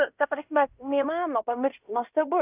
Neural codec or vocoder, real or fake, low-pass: codec, 16 kHz in and 24 kHz out, 0.9 kbps, LongCat-Audio-Codec, fine tuned four codebook decoder; fake; 3.6 kHz